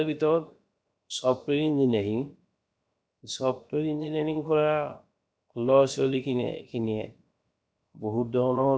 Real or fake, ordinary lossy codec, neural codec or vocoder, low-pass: fake; none; codec, 16 kHz, 0.7 kbps, FocalCodec; none